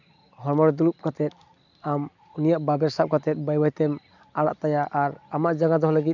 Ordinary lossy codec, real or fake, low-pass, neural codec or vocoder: none; real; 7.2 kHz; none